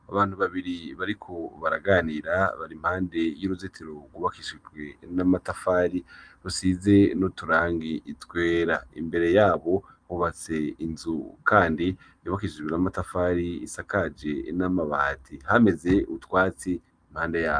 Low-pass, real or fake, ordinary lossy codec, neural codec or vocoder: 9.9 kHz; real; Opus, 32 kbps; none